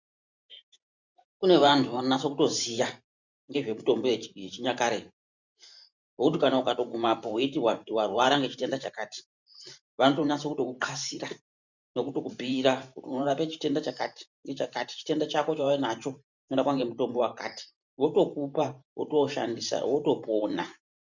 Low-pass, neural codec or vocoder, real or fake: 7.2 kHz; vocoder, 44.1 kHz, 128 mel bands every 512 samples, BigVGAN v2; fake